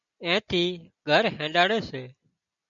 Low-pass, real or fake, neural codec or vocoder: 7.2 kHz; real; none